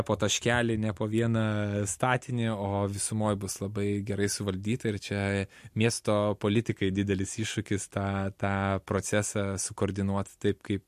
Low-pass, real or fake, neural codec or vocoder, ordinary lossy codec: 14.4 kHz; real; none; MP3, 64 kbps